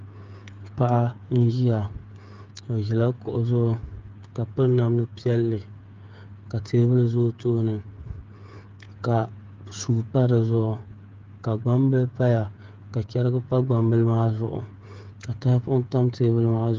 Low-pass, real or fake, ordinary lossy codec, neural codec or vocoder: 7.2 kHz; fake; Opus, 32 kbps; codec, 16 kHz, 8 kbps, FreqCodec, smaller model